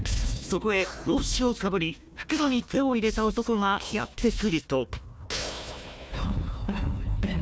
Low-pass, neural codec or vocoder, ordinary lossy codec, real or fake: none; codec, 16 kHz, 1 kbps, FunCodec, trained on Chinese and English, 50 frames a second; none; fake